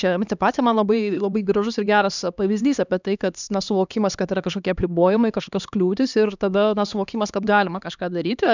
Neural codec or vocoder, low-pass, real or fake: codec, 16 kHz, 2 kbps, X-Codec, HuBERT features, trained on LibriSpeech; 7.2 kHz; fake